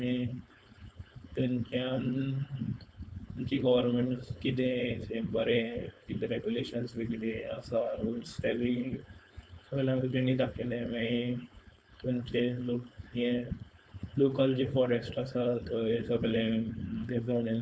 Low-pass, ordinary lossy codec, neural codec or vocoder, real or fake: none; none; codec, 16 kHz, 4.8 kbps, FACodec; fake